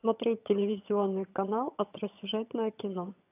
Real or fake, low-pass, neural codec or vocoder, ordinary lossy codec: fake; 3.6 kHz; vocoder, 22.05 kHz, 80 mel bands, HiFi-GAN; AAC, 32 kbps